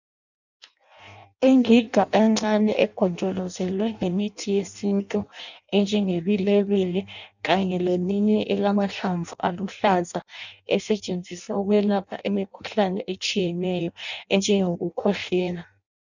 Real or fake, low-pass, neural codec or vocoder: fake; 7.2 kHz; codec, 16 kHz in and 24 kHz out, 0.6 kbps, FireRedTTS-2 codec